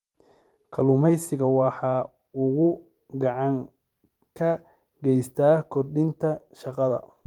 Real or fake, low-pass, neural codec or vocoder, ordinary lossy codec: fake; 19.8 kHz; vocoder, 44.1 kHz, 128 mel bands every 512 samples, BigVGAN v2; Opus, 32 kbps